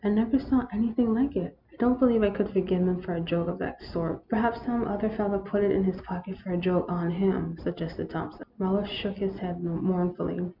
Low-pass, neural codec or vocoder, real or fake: 5.4 kHz; none; real